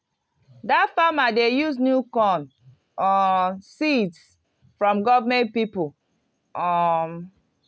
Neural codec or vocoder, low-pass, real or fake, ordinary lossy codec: none; none; real; none